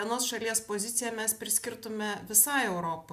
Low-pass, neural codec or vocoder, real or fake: 14.4 kHz; none; real